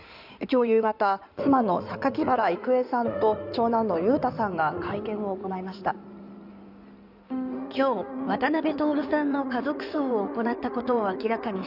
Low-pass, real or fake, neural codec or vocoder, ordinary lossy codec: 5.4 kHz; fake; codec, 16 kHz in and 24 kHz out, 2.2 kbps, FireRedTTS-2 codec; none